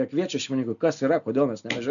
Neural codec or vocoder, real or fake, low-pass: none; real; 7.2 kHz